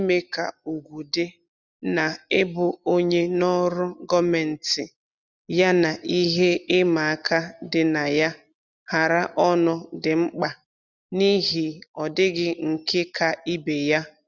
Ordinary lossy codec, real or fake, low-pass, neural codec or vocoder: none; real; 7.2 kHz; none